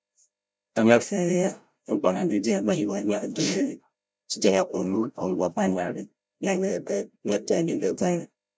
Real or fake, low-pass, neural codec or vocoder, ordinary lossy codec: fake; none; codec, 16 kHz, 0.5 kbps, FreqCodec, larger model; none